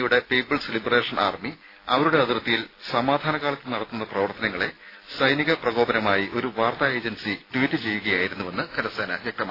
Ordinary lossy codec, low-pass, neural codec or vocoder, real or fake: AAC, 24 kbps; 5.4 kHz; none; real